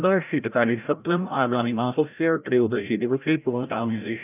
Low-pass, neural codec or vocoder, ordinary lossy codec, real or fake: 3.6 kHz; codec, 16 kHz, 0.5 kbps, FreqCodec, larger model; none; fake